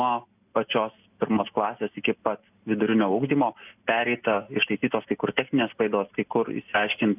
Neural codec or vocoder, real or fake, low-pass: none; real; 3.6 kHz